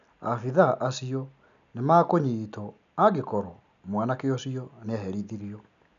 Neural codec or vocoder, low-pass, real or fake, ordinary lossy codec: none; 7.2 kHz; real; none